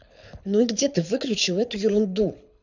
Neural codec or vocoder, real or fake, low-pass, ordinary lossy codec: codec, 24 kHz, 6 kbps, HILCodec; fake; 7.2 kHz; none